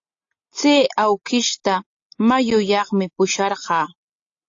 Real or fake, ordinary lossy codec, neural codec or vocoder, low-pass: real; AAC, 48 kbps; none; 7.2 kHz